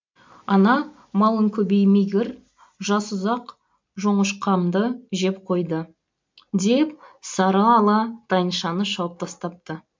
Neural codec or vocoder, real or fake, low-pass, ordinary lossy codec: none; real; 7.2 kHz; MP3, 48 kbps